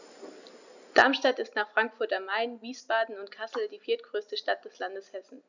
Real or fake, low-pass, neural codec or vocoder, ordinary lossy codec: real; 7.2 kHz; none; none